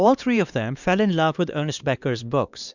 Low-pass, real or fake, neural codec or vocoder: 7.2 kHz; fake; codec, 16 kHz, 2 kbps, X-Codec, HuBERT features, trained on LibriSpeech